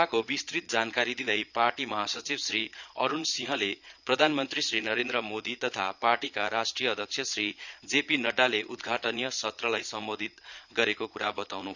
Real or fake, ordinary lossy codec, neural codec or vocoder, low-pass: fake; none; vocoder, 22.05 kHz, 80 mel bands, Vocos; 7.2 kHz